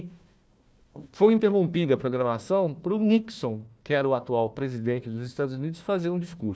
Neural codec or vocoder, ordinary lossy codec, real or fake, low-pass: codec, 16 kHz, 1 kbps, FunCodec, trained on Chinese and English, 50 frames a second; none; fake; none